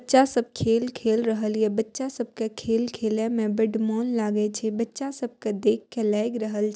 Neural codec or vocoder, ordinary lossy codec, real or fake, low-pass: none; none; real; none